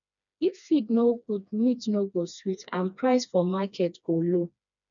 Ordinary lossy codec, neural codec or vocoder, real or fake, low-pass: none; codec, 16 kHz, 2 kbps, FreqCodec, smaller model; fake; 7.2 kHz